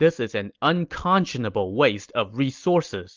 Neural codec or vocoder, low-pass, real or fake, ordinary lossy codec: none; 7.2 kHz; real; Opus, 24 kbps